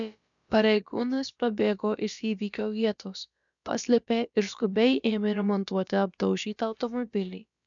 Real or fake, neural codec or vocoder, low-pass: fake; codec, 16 kHz, about 1 kbps, DyCAST, with the encoder's durations; 7.2 kHz